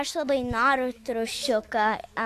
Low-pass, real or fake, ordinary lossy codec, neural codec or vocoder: 14.4 kHz; fake; AAC, 64 kbps; autoencoder, 48 kHz, 128 numbers a frame, DAC-VAE, trained on Japanese speech